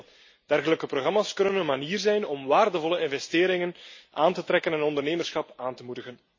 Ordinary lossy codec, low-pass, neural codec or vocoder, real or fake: MP3, 32 kbps; 7.2 kHz; none; real